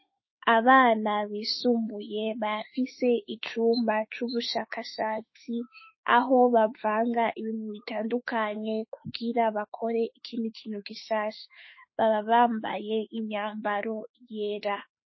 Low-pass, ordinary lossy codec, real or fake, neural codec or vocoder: 7.2 kHz; MP3, 24 kbps; fake; autoencoder, 48 kHz, 32 numbers a frame, DAC-VAE, trained on Japanese speech